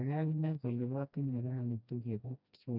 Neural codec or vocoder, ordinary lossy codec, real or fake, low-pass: codec, 16 kHz, 1 kbps, FreqCodec, smaller model; none; fake; 5.4 kHz